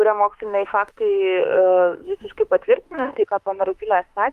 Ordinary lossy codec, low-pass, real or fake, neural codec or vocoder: Opus, 32 kbps; 14.4 kHz; fake; autoencoder, 48 kHz, 32 numbers a frame, DAC-VAE, trained on Japanese speech